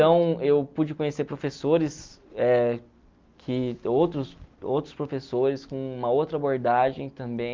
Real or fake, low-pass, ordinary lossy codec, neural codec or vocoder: real; 7.2 kHz; Opus, 24 kbps; none